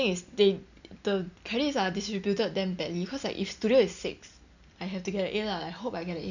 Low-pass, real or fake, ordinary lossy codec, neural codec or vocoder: 7.2 kHz; real; none; none